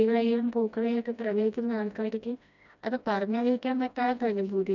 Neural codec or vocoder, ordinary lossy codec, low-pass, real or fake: codec, 16 kHz, 1 kbps, FreqCodec, smaller model; none; 7.2 kHz; fake